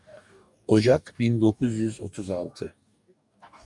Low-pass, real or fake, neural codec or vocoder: 10.8 kHz; fake; codec, 44.1 kHz, 2.6 kbps, DAC